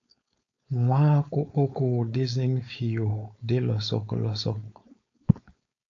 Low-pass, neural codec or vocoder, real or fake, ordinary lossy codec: 7.2 kHz; codec, 16 kHz, 4.8 kbps, FACodec; fake; AAC, 48 kbps